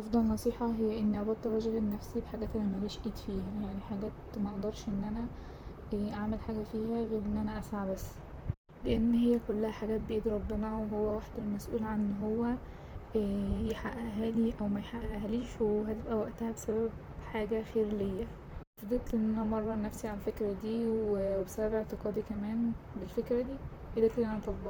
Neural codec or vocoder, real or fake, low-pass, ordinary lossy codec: vocoder, 44.1 kHz, 128 mel bands, Pupu-Vocoder; fake; none; none